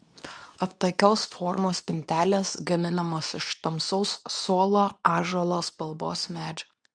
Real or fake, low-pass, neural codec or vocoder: fake; 9.9 kHz; codec, 24 kHz, 0.9 kbps, WavTokenizer, medium speech release version 2